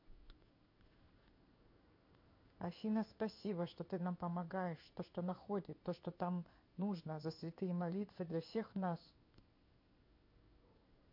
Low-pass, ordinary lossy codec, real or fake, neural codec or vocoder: 5.4 kHz; MP3, 32 kbps; fake; codec, 16 kHz in and 24 kHz out, 1 kbps, XY-Tokenizer